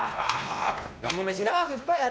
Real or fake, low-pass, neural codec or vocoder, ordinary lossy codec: fake; none; codec, 16 kHz, 1 kbps, X-Codec, WavLM features, trained on Multilingual LibriSpeech; none